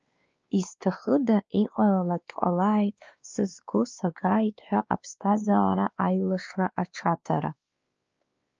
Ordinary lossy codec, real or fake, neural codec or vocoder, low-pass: Opus, 24 kbps; fake; codec, 16 kHz, 2 kbps, X-Codec, WavLM features, trained on Multilingual LibriSpeech; 7.2 kHz